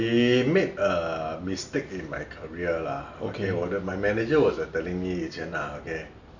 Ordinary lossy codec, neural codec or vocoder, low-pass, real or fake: none; none; 7.2 kHz; real